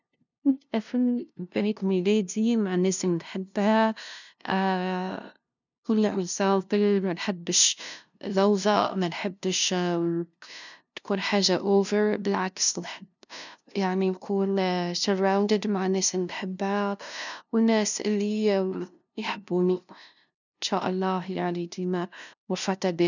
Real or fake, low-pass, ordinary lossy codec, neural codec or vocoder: fake; 7.2 kHz; none; codec, 16 kHz, 0.5 kbps, FunCodec, trained on LibriTTS, 25 frames a second